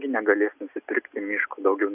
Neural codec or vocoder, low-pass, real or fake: none; 3.6 kHz; real